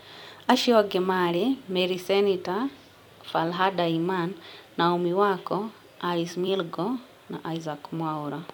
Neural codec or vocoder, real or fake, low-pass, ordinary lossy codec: vocoder, 44.1 kHz, 128 mel bands every 256 samples, BigVGAN v2; fake; 19.8 kHz; none